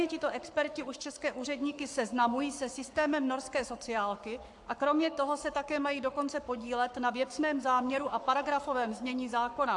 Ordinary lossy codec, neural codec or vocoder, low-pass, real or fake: AAC, 64 kbps; codec, 44.1 kHz, 7.8 kbps, Pupu-Codec; 10.8 kHz; fake